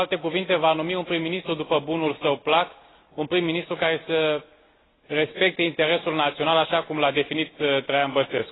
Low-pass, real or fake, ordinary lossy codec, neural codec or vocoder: 7.2 kHz; real; AAC, 16 kbps; none